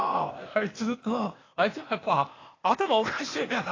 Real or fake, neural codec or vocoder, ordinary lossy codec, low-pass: fake; codec, 16 kHz, 0.8 kbps, ZipCodec; AAC, 32 kbps; 7.2 kHz